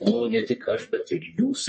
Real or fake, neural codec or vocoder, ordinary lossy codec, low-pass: fake; codec, 44.1 kHz, 1.7 kbps, Pupu-Codec; MP3, 32 kbps; 10.8 kHz